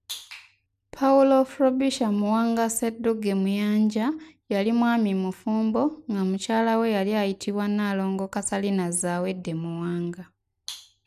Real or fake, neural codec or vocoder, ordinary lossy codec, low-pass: real; none; none; 14.4 kHz